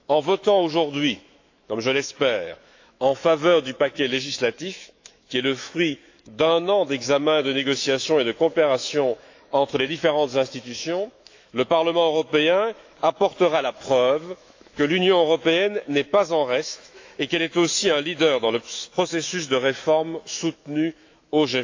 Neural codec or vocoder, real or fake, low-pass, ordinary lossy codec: autoencoder, 48 kHz, 128 numbers a frame, DAC-VAE, trained on Japanese speech; fake; 7.2 kHz; AAC, 48 kbps